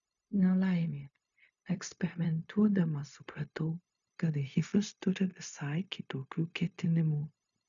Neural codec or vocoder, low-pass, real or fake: codec, 16 kHz, 0.4 kbps, LongCat-Audio-Codec; 7.2 kHz; fake